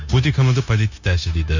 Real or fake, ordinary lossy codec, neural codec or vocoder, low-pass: fake; none; codec, 16 kHz, 0.9 kbps, LongCat-Audio-Codec; 7.2 kHz